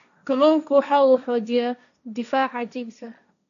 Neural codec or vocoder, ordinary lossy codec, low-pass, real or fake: codec, 16 kHz, 1.1 kbps, Voila-Tokenizer; none; 7.2 kHz; fake